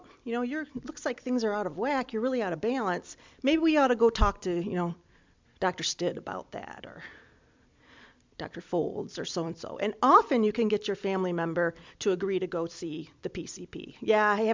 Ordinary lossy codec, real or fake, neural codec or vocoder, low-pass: MP3, 64 kbps; fake; vocoder, 44.1 kHz, 128 mel bands every 256 samples, BigVGAN v2; 7.2 kHz